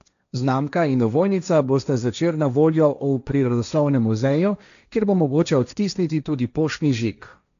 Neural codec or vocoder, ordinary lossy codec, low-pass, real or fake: codec, 16 kHz, 1.1 kbps, Voila-Tokenizer; none; 7.2 kHz; fake